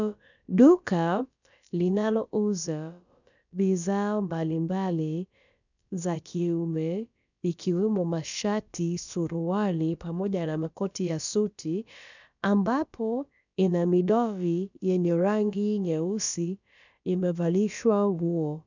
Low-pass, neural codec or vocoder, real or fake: 7.2 kHz; codec, 16 kHz, about 1 kbps, DyCAST, with the encoder's durations; fake